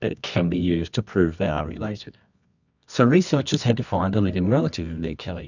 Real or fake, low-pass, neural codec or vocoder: fake; 7.2 kHz; codec, 24 kHz, 0.9 kbps, WavTokenizer, medium music audio release